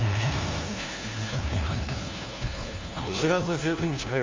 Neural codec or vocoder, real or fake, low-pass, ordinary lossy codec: codec, 16 kHz, 1 kbps, FunCodec, trained on LibriTTS, 50 frames a second; fake; 7.2 kHz; Opus, 32 kbps